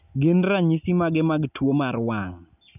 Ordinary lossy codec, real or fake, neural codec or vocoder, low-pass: none; real; none; 3.6 kHz